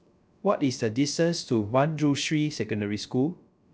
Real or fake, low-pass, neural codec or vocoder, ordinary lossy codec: fake; none; codec, 16 kHz, 0.3 kbps, FocalCodec; none